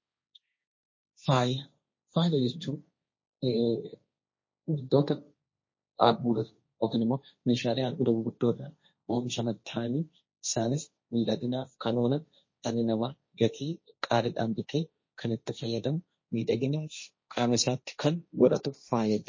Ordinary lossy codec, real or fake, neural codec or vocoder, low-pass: MP3, 32 kbps; fake; codec, 16 kHz, 1.1 kbps, Voila-Tokenizer; 7.2 kHz